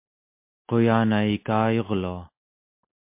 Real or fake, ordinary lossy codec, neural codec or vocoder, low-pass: real; MP3, 24 kbps; none; 3.6 kHz